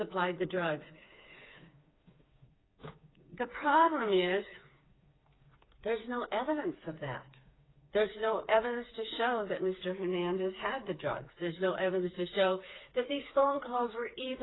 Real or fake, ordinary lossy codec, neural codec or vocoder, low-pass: fake; AAC, 16 kbps; codec, 16 kHz, 4 kbps, FreqCodec, smaller model; 7.2 kHz